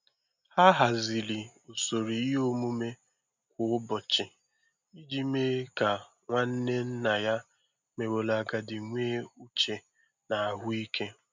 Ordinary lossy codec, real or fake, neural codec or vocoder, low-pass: none; real; none; 7.2 kHz